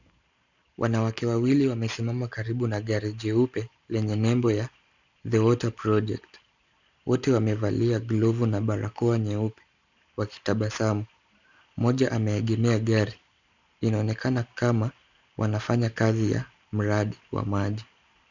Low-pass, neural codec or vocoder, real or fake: 7.2 kHz; none; real